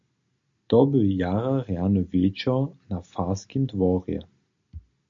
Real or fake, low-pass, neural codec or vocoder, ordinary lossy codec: real; 7.2 kHz; none; MP3, 48 kbps